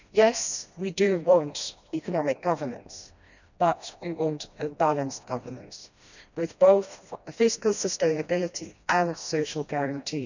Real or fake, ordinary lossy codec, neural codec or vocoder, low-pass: fake; none; codec, 16 kHz, 1 kbps, FreqCodec, smaller model; 7.2 kHz